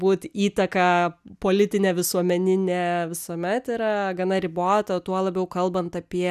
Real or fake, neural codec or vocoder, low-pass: real; none; 14.4 kHz